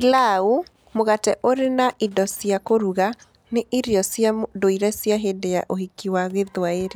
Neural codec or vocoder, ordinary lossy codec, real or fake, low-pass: none; none; real; none